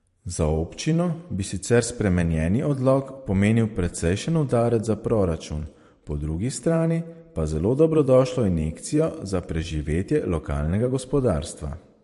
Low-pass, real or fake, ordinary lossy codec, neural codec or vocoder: 14.4 kHz; fake; MP3, 48 kbps; vocoder, 44.1 kHz, 128 mel bands every 512 samples, BigVGAN v2